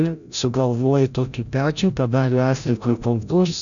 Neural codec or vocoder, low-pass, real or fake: codec, 16 kHz, 0.5 kbps, FreqCodec, larger model; 7.2 kHz; fake